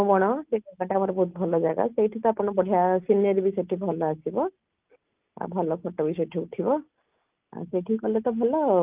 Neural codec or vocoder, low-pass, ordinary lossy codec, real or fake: none; 3.6 kHz; Opus, 24 kbps; real